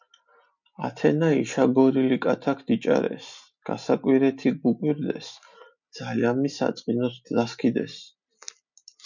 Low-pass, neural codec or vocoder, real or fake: 7.2 kHz; none; real